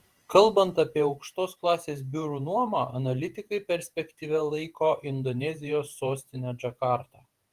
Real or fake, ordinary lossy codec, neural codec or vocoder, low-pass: fake; Opus, 24 kbps; vocoder, 48 kHz, 128 mel bands, Vocos; 14.4 kHz